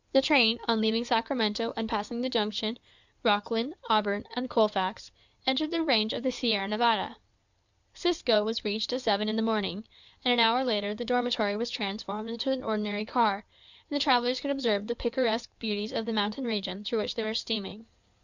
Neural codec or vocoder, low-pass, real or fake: codec, 16 kHz in and 24 kHz out, 2.2 kbps, FireRedTTS-2 codec; 7.2 kHz; fake